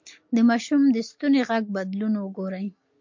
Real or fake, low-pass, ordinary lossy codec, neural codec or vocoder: real; 7.2 kHz; MP3, 48 kbps; none